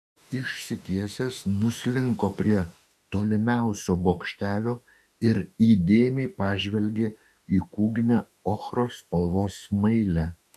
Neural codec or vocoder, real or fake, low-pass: autoencoder, 48 kHz, 32 numbers a frame, DAC-VAE, trained on Japanese speech; fake; 14.4 kHz